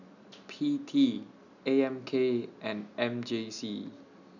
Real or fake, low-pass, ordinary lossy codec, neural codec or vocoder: real; 7.2 kHz; none; none